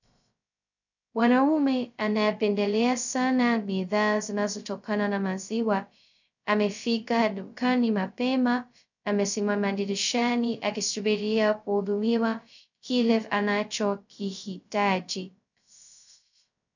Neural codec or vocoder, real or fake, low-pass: codec, 16 kHz, 0.2 kbps, FocalCodec; fake; 7.2 kHz